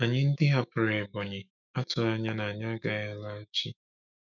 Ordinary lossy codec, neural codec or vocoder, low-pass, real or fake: none; autoencoder, 48 kHz, 128 numbers a frame, DAC-VAE, trained on Japanese speech; 7.2 kHz; fake